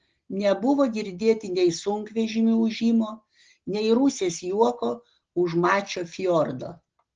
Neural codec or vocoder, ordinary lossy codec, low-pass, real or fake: none; Opus, 16 kbps; 7.2 kHz; real